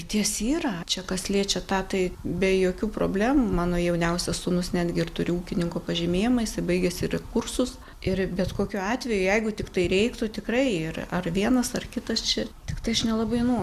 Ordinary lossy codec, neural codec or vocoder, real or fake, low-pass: Opus, 64 kbps; none; real; 14.4 kHz